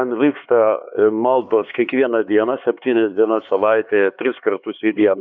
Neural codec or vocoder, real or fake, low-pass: codec, 16 kHz, 4 kbps, X-Codec, HuBERT features, trained on LibriSpeech; fake; 7.2 kHz